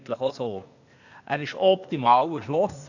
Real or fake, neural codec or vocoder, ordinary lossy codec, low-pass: fake; codec, 16 kHz, 0.8 kbps, ZipCodec; none; 7.2 kHz